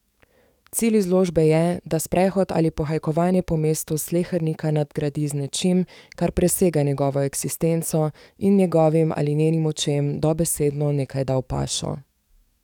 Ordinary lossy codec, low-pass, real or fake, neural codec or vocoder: none; 19.8 kHz; fake; codec, 44.1 kHz, 7.8 kbps, DAC